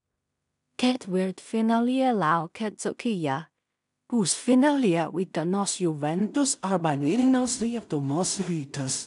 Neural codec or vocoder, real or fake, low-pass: codec, 16 kHz in and 24 kHz out, 0.4 kbps, LongCat-Audio-Codec, two codebook decoder; fake; 10.8 kHz